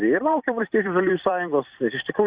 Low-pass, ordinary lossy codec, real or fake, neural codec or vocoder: 3.6 kHz; Opus, 64 kbps; fake; vocoder, 44.1 kHz, 128 mel bands every 256 samples, BigVGAN v2